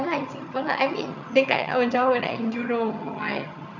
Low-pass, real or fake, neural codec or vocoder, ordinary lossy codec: 7.2 kHz; fake; vocoder, 22.05 kHz, 80 mel bands, HiFi-GAN; none